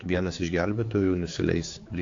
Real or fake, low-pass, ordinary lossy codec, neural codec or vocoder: fake; 7.2 kHz; AAC, 48 kbps; codec, 16 kHz, 4 kbps, X-Codec, HuBERT features, trained on general audio